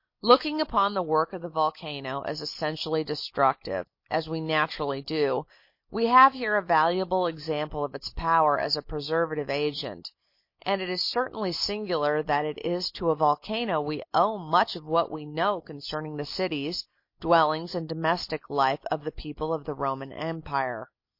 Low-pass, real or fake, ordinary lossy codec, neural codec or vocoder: 5.4 kHz; real; MP3, 32 kbps; none